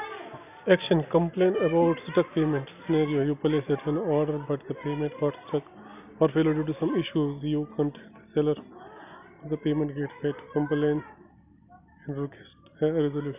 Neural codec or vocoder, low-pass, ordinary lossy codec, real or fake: none; 3.6 kHz; none; real